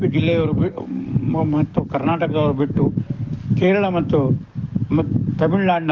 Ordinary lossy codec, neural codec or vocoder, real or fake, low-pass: Opus, 24 kbps; codec, 44.1 kHz, 7.8 kbps, DAC; fake; 7.2 kHz